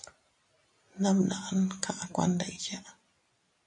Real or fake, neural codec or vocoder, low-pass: real; none; 10.8 kHz